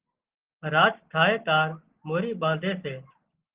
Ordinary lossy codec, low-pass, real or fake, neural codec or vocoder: Opus, 16 kbps; 3.6 kHz; real; none